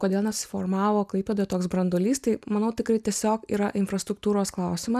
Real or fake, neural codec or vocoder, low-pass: real; none; 14.4 kHz